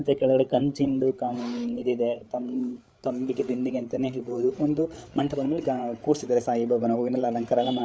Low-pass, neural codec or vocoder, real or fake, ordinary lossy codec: none; codec, 16 kHz, 8 kbps, FreqCodec, larger model; fake; none